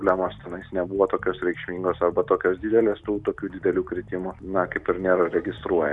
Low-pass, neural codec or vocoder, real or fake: 10.8 kHz; none; real